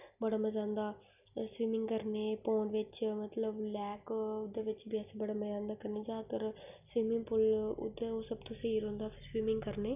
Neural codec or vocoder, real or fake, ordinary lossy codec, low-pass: none; real; none; 3.6 kHz